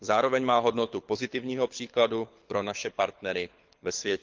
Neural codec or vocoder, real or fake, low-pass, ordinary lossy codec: codec, 24 kHz, 6 kbps, HILCodec; fake; 7.2 kHz; Opus, 32 kbps